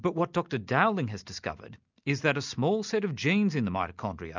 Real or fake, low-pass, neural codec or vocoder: real; 7.2 kHz; none